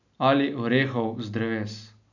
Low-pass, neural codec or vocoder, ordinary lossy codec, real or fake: 7.2 kHz; none; none; real